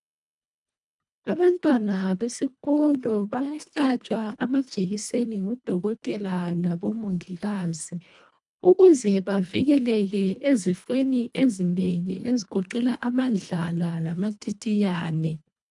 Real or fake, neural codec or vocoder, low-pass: fake; codec, 24 kHz, 1.5 kbps, HILCodec; 10.8 kHz